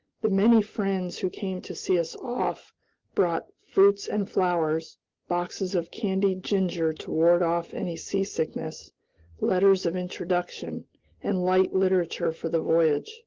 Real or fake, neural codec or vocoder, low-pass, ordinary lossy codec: real; none; 7.2 kHz; Opus, 16 kbps